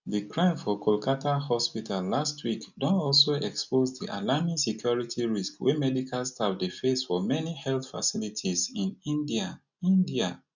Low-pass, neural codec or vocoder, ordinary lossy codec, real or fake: 7.2 kHz; none; none; real